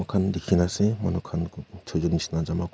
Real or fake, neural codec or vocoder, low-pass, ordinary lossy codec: real; none; none; none